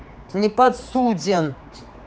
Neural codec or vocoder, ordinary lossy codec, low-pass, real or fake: codec, 16 kHz, 4 kbps, X-Codec, HuBERT features, trained on general audio; none; none; fake